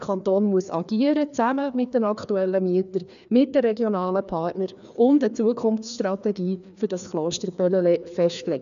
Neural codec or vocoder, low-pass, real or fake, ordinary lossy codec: codec, 16 kHz, 2 kbps, FreqCodec, larger model; 7.2 kHz; fake; none